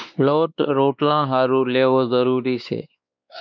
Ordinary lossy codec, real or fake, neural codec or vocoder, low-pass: MP3, 64 kbps; fake; codec, 16 kHz, 2 kbps, X-Codec, WavLM features, trained on Multilingual LibriSpeech; 7.2 kHz